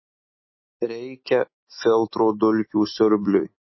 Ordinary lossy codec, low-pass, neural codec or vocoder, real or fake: MP3, 24 kbps; 7.2 kHz; none; real